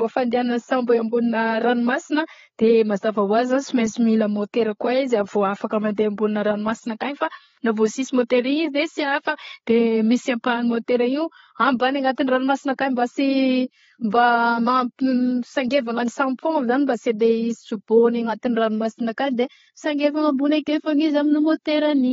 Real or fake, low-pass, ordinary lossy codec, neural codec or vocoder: fake; 7.2 kHz; AAC, 24 kbps; codec, 16 kHz, 8 kbps, FreqCodec, larger model